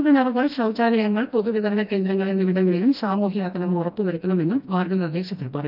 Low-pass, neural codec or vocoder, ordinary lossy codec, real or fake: 5.4 kHz; codec, 16 kHz, 1 kbps, FreqCodec, smaller model; none; fake